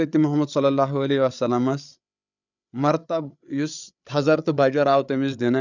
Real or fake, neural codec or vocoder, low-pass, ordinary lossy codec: fake; codec, 16 kHz, 8 kbps, FreqCodec, larger model; 7.2 kHz; none